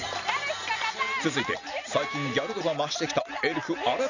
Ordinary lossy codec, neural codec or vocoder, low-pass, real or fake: none; none; 7.2 kHz; real